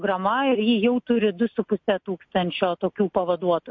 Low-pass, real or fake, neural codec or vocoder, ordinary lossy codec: 7.2 kHz; real; none; MP3, 48 kbps